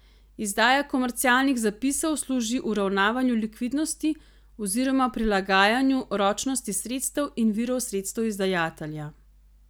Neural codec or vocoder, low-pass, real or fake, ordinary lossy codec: none; none; real; none